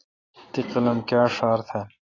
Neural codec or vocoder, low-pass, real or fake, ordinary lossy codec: none; 7.2 kHz; real; AAC, 32 kbps